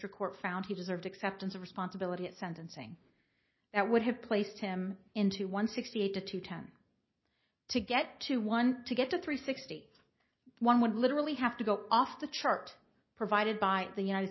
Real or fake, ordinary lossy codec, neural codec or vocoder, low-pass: real; MP3, 24 kbps; none; 7.2 kHz